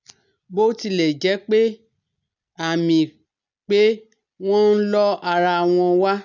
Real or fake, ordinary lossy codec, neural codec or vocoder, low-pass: real; none; none; 7.2 kHz